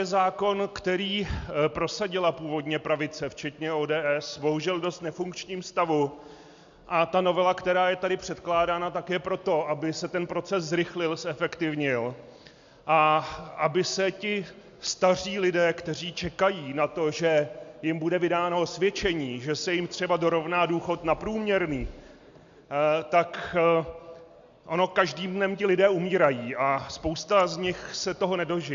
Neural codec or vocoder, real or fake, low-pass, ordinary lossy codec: none; real; 7.2 kHz; MP3, 64 kbps